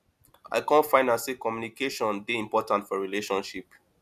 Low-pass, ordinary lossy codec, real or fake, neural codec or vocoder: 14.4 kHz; none; real; none